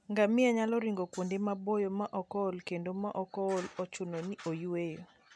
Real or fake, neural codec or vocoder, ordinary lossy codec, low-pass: real; none; none; none